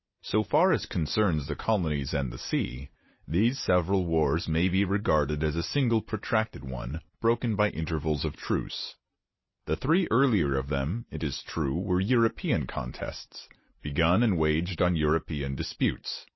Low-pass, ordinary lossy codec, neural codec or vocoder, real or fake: 7.2 kHz; MP3, 24 kbps; none; real